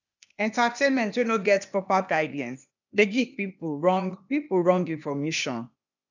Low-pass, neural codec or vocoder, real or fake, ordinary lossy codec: 7.2 kHz; codec, 16 kHz, 0.8 kbps, ZipCodec; fake; none